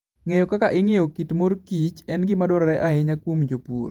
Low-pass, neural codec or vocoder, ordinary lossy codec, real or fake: 19.8 kHz; vocoder, 48 kHz, 128 mel bands, Vocos; Opus, 32 kbps; fake